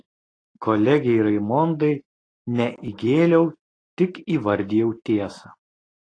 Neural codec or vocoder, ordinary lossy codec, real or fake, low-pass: none; AAC, 32 kbps; real; 9.9 kHz